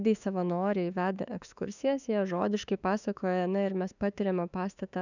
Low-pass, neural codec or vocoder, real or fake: 7.2 kHz; autoencoder, 48 kHz, 32 numbers a frame, DAC-VAE, trained on Japanese speech; fake